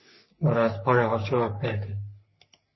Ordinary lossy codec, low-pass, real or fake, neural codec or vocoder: MP3, 24 kbps; 7.2 kHz; fake; codec, 44.1 kHz, 3.4 kbps, Pupu-Codec